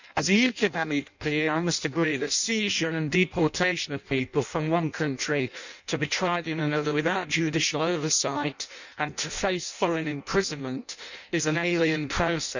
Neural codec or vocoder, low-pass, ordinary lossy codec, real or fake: codec, 16 kHz in and 24 kHz out, 0.6 kbps, FireRedTTS-2 codec; 7.2 kHz; none; fake